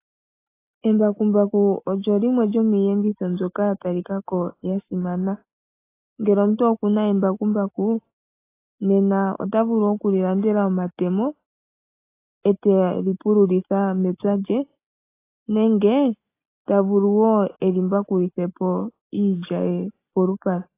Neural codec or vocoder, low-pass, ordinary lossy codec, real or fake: none; 3.6 kHz; AAC, 24 kbps; real